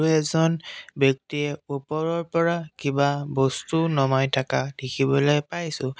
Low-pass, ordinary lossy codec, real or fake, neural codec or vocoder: none; none; real; none